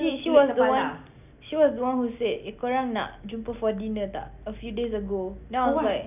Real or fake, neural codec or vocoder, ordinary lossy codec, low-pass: real; none; none; 3.6 kHz